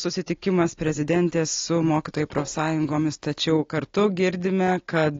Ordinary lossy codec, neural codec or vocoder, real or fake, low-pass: AAC, 32 kbps; none; real; 7.2 kHz